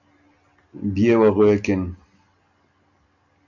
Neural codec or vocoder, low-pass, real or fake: none; 7.2 kHz; real